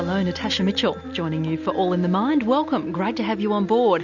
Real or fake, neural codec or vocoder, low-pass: real; none; 7.2 kHz